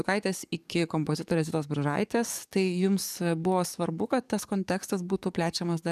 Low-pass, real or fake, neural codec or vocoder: 14.4 kHz; fake; codec, 44.1 kHz, 7.8 kbps, DAC